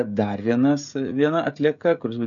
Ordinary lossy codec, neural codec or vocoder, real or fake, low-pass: MP3, 96 kbps; codec, 16 kHz, 16 kbps, FreqCodec, smaller model; fake; 7.2 kHz